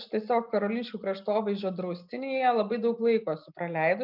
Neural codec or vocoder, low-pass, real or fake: none; 5.4 kHz; real